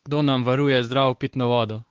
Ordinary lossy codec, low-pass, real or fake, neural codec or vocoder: Opus, 16 kbps; 7.2 kHz; fake; codec, 16 kHz, 2 kbps, X-Codec, WavLM features, trained on Multilingual LibriSpeech